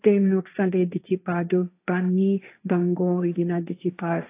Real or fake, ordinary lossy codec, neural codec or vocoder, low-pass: fake; AAC, 24 kbps; codec, 16 kHz, 1.1 kbps, Voila-Tokenizer; 3.6 kHz